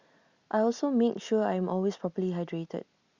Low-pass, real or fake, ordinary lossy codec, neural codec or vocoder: 7.2 kHz; real; Opus, 64 kbps; none